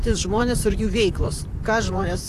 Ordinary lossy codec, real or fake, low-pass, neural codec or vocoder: AAC, 64 kbps; fake; 14.4 kHz; vocoder, 44.1 kHz, 128 mel bands, Pupu-Vocoder